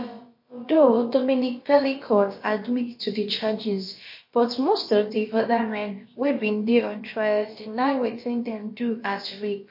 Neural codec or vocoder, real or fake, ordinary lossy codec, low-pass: codec, 16 kHz, about 1 kbps, DyCAST, with the encoder's durations; fake; MP3, 32 kbps; 5.4 kHz